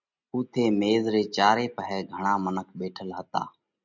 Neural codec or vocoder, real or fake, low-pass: none; real; 7.2 kHz